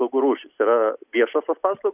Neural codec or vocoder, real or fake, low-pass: none; real; 3.6 kHz